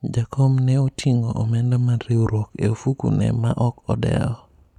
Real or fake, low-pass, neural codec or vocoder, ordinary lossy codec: real; 19.8 kHz; none; none